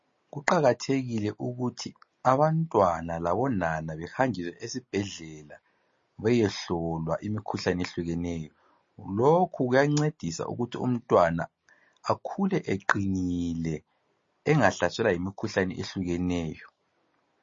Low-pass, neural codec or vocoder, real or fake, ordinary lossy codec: 7.2 kHz; none; real; MP3, 32 kbps